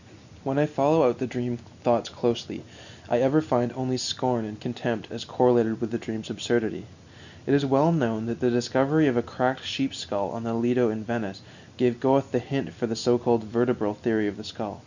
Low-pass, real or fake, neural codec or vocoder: 7.2 kHz; real; none